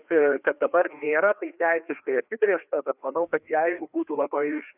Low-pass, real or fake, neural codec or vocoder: 3.6 kHz; fake; codec, 16 kHz, 2 kbps, FreqCodec, larger model